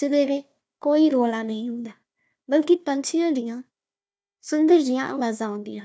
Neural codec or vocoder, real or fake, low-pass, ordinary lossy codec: codec, 16 kHz, 1 kbps, FunCodec, trained on Chinese and English, 50 frames a second; fake; none; none